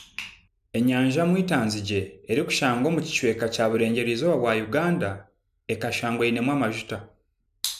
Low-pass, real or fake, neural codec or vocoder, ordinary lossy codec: 14.4 kHz; real; none; none